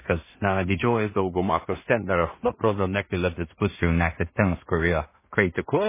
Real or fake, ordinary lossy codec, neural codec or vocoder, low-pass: fake; MP3, 16 kbps; codec, 16 kHz in and 24 kHz out, 0.4 kbps, LongCat-Audio-Codec, two codebook decoder; 3.6 kHz